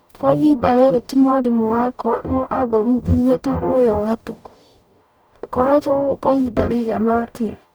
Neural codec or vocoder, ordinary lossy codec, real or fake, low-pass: codec, 44.1 kHz, 0.9 kbps, DAC; none; fake; none